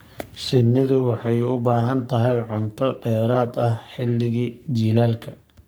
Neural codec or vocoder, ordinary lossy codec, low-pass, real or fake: codec, 44.1 kHz, 3.4 kbps, Pupu-Codec; none; none; fake